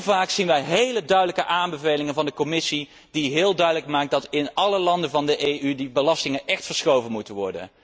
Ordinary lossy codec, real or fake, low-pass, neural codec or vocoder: none; real; none; none